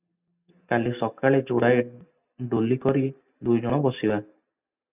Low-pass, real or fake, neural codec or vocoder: 3.6 kHz; real; none